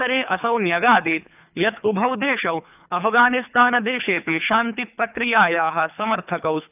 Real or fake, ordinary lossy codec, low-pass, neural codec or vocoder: fake; none; 3.6 kHz; codec, 24 kHz, 3 kbps, HILCodec